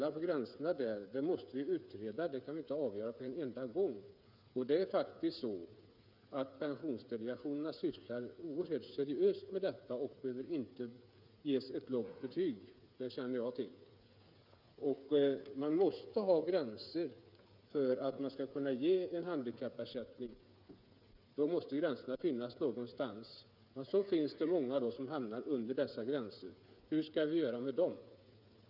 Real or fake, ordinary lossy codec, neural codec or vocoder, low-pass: fake; none; codec, 16 kHz, 8 kbps, FreqCodec, smaller model; 5.4 kHz